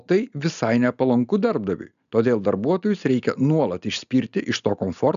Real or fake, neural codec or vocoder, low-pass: real; none; 7.2 kHz